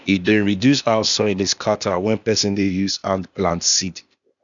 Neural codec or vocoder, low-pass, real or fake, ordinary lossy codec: codec, 16 kHz, 0.8 kbps, ZipCodec; 7.2 kHz; fake; none